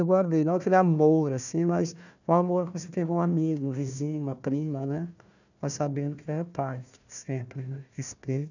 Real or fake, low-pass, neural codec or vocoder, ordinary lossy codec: fake; 7.2 kHz; codec, 16 kHz, 1 kbps, FunCodec, trained on Chinese and English, 50 frames a second; none